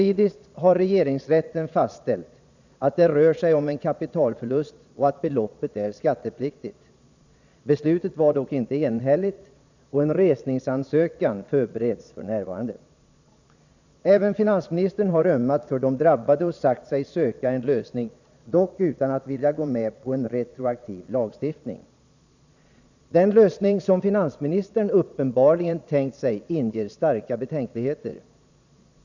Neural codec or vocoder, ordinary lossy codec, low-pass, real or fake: vocoder, 22.05 kHz, 80 mel bands, Vocos; none; 7.2 kHz; fake